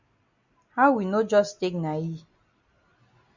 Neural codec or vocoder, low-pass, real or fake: none; 7.2 kHz; real